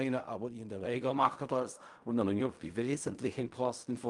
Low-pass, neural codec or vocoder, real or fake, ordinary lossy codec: 10.8 kHz; codec, 16 kHz in and 24 kHz out, 0.4 kbps, LongCat-Audio-Codec, fine tuned four codebook decoder; fake; Opus, 32 kbps